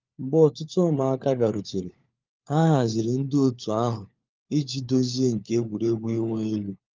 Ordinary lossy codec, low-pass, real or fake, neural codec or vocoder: Opus, 24 kbps; 7.2 kHz; fake; codec, 16 kHz, 4 kbps, FunCodec, trained on LibriTTS, 50 frames a second